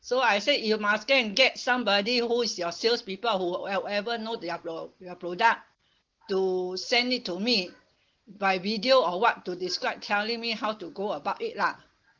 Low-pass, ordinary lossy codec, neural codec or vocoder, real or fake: 7.2 kHz; Opus, 16 kbps; none; real